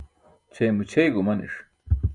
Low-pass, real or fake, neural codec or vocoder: 10.8 kHz; real; none